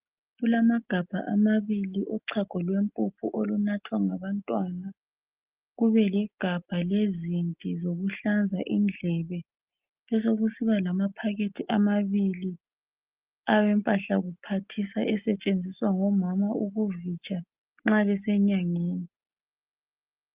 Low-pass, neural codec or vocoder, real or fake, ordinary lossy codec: 3.6 kHz; none; real; Opus, 24 kbps